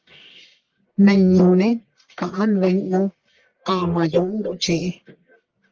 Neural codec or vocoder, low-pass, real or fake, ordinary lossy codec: codec, 44.1 kHz, 1.7 kbps, Pupu-Codec; 7.2 kHz; fake; Opus, 24 kbps